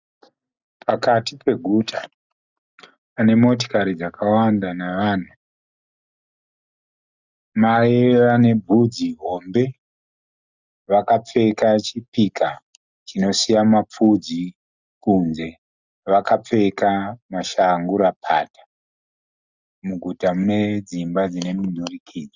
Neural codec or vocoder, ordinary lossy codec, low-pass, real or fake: none; Opus, 64 kbps; 7.2 kHz; real